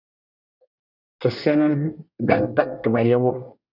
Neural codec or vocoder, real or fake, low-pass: codec, 24 kHz, 1 kbps, SNAC; fake; 5.4 kHz